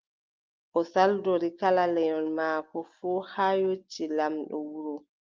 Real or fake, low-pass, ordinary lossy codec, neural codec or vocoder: real; 7.2 kHz; Opus, 24 kbps; none